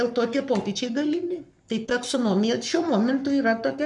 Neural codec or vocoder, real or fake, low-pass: codec, 44.1 kHz, 7.8 kbps, Pupu-Codec; fake; 10.8 kHz